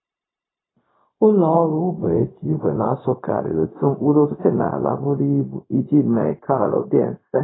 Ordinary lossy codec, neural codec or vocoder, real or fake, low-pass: AAC, 16 kbps; codec, 16 kHz, 0.4 kbps, LongCat-Audio-Codec; fake; 7.2 kHz